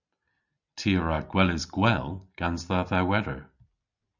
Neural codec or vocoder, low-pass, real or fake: none; 7.2 kHz; real